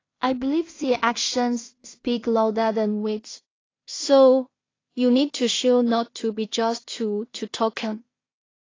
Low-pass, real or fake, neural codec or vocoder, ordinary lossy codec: 7.2 kHz; fake; codec, 16 kHz in and 24 kHz out, 0.4 kbps, LongCat-Audio-Codec, two codebook decoder; AAC, 32 kbps